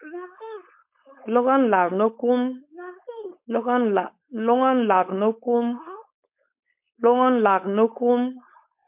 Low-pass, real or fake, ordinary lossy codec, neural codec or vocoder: 3.6 kHz; fake; MP3, 32 kbps; codec, 16 kHz, 4.8 kbps, FACodec